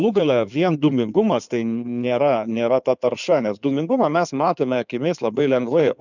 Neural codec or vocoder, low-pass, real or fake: codec, 16 kHz in and 24 kHz out, 2.2 kbps, FireRedTTS-2 codec; 7.2 kHz; fake